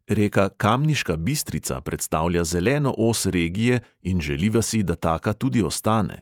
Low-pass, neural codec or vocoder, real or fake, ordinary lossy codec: 19.8 kHz; vocoder, 48 kHz, 128 mel bands, Vocos; fake; none